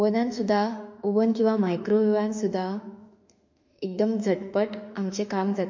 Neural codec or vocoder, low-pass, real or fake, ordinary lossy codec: autoencoder, 48 kHz, 32 numbers a frame, DAC-VAE, trained on Japanese speech; 7.2 kHz; fake; MP3, 48 kbps